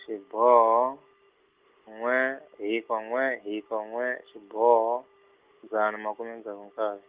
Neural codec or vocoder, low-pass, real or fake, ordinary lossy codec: none; 3.6 kHz; real; Opus, 24 kbps